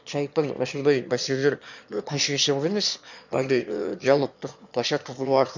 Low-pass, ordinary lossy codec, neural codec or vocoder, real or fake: 7.2 kHz; none; autoencoder, 22.05 kHz, a latent of 192 numbers a frame, VITS, trained on one speaker; fake